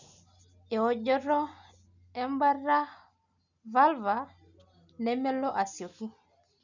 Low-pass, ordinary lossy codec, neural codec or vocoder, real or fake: 7.2 kHz; none; none; real